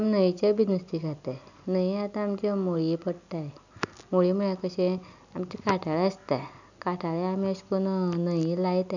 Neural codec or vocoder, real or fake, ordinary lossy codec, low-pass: none; real; none; 7.2 kHz